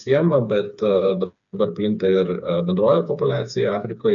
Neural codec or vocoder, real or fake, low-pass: codec, 16 kHz, 4 kbps, FreqCodec, smaller model; fake; 7.2 kHz